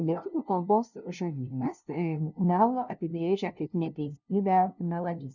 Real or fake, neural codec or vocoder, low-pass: fake; codec, 16 kHz, 0.5 kbps, FunCodec, trained on LibriTTS, 25 frames a second; 7.2 kHz